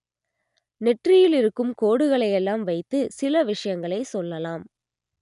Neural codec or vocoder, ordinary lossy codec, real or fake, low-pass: none; none; real; 10.8 kHz